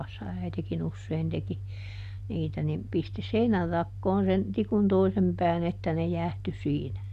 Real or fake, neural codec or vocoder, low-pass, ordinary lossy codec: real; none; 14.4 kHz; none